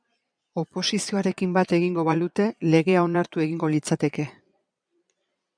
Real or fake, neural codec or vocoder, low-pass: fake; vocoder, 22.05 kHz, 80 mel bands, Vocos; 9.9 kHz